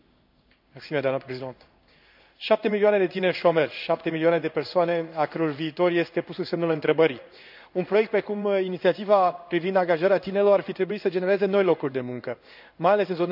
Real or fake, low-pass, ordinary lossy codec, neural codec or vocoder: fake; 5.4 kHz; none; codec, 16 kHz in and 24 kHz out, 1 kbps, XY-Tokenizer